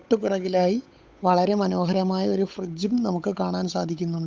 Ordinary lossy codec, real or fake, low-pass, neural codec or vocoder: none; fake; none; codec, 16 kHz, 8 kbps, FunCodec, trained on Chinese and English, 25 frames a second